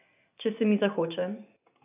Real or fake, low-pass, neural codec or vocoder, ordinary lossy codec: real; 3.6 kHz; none; none